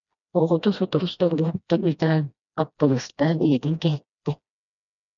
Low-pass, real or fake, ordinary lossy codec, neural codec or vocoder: 7.2 kHz; fake; MP3, 96 kbps; codec, 16 kHz, 1 kbps, FreqCodec, smaller model